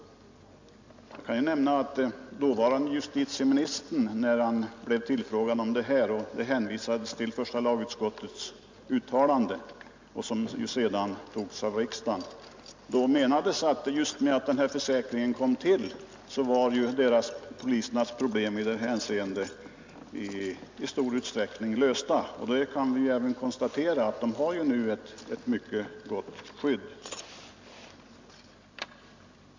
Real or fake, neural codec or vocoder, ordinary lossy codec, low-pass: real; none; MP3, 64 kbps; 7.2 kHz